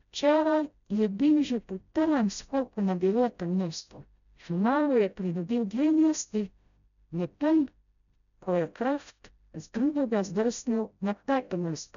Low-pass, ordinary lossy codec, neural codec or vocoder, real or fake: 7.2 kHz; none; codec, 16 kHz, 0.5 kbps, FreqCodec, smaller model; fake